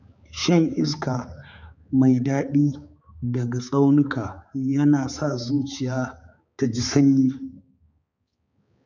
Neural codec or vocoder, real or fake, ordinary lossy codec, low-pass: codec, 16 kHz, 4 kbps, X-Codec, HuBERT features, trained on balanced general audio; fake; none; 7.2 kHz